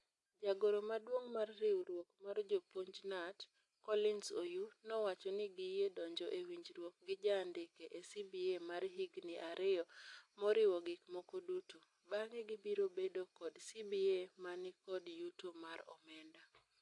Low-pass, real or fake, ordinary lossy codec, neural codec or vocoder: 10.8 kHz; real; AAC, 48 kbps; none